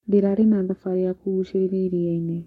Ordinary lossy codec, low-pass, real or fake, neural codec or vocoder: MP3, 64 kbps; 19.8 kHz; fake; codec, 44.1 kHz, 7.8 kbps, Pupu-Codec